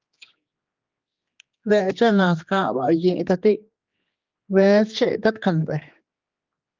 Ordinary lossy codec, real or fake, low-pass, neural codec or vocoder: Opus, 24 kbps; fake; 7.2 kHz; codec, 16 kHz, 2 kbps, X-Codec, HuBERT features, trained on general audio